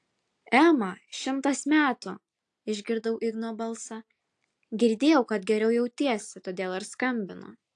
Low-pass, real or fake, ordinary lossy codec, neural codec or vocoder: 10.8 kHz; real; AAC, 64 kbps; none